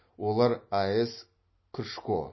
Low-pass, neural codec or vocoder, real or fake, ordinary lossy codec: 7.2 kHz; none; real; MP3, 24 kbps